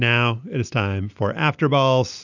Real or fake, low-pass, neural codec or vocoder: real; 7.2 kHz; none